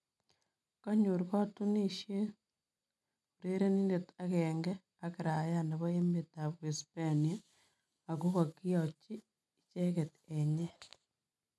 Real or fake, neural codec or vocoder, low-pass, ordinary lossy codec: real; none; none; none